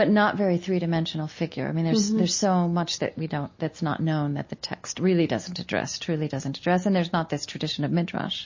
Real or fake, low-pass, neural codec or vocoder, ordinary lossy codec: real; 7.2 kHz; none; MP3, 32 kbps